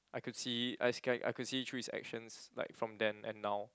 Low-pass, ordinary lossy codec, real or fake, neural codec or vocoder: none; none; real; none